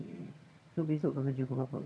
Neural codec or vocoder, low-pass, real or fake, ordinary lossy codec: vocoder, 22.05 kHz, 80 mel bands, HiFi-GAN; none; fake; none